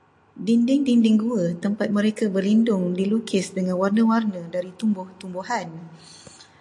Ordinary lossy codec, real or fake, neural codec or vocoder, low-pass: AAC, 64 kbps; real; none; 10.8 kHz